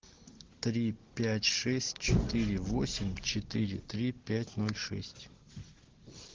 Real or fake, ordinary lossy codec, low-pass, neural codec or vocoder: real; Opus, 16 kbps; 7.2 kHz; none